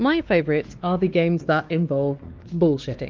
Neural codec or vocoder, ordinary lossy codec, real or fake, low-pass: codec, 16 kHz, 2 kbps, X-Codec, WavLM features, trained on Multilingual LibriSpeech; Opus, 24 kbps; fake; 7.2 kHz